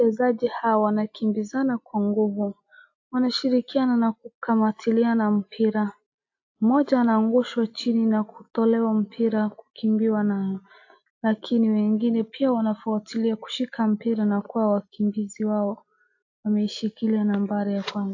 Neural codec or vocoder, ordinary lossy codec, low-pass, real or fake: none; AAC, 48 kbps; 7.2 kHz; real